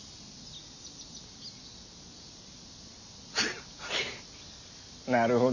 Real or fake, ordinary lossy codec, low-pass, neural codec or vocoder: real; none; 7.2 kHz; none